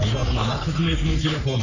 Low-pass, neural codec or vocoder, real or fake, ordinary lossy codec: 7.2 kHz; codec, 44.1 kHz, 3.4 kbps, Pupu-Codec; fake; none